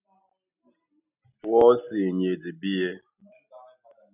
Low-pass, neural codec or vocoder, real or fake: 3.6 kHz; none; real